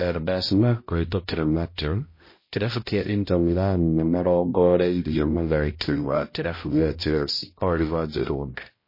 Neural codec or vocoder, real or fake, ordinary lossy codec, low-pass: codec, 16 kHz, 0.5 kbps, X-Codec, HuBERT features, trained on balanced general audio; fake; MP3, 24 kbps; 5.4 kHz